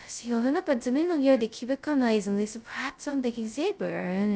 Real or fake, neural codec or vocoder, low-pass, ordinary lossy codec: fake; codec, 16 kHz, 0.2 kbps, FocalCodec; none; none